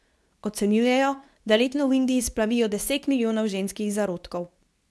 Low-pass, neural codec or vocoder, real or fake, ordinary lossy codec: none; codec, 24 kHz, 0.9 kbps, WavTokenizer, medium speech release version 2; fake; none